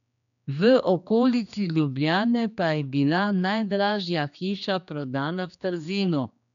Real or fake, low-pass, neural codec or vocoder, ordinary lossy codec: fake; 7.2 kHz; codec, 16 kHz, 2 kbps, X-Codec, HuBERT features, trained on general audio; none